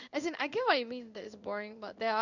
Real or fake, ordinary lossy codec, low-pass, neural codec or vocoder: fake; none; 7.2 kHz; codec, 16 kHz in and 24 kHz out, 1 kbps, XY-Tokenizer